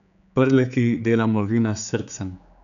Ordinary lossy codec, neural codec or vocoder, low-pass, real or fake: none; codec, 16 kHz, 4 kbps, X-Codec, HuBERT features, trained on general audio; 7.2 kHz; fake